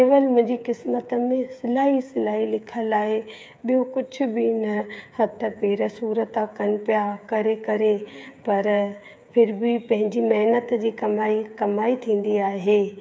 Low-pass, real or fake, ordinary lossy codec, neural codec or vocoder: none; fake; none; codec, 16 kHz, 8 kbps, FreqCodec, smaller model